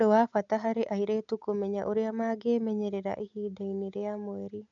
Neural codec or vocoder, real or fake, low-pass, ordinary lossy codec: none; real; 7.2 kHz; MP3, 64 kbps